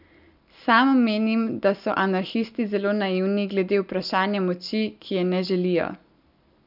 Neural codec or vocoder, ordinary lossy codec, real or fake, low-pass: none; none; real; 5.4 kHz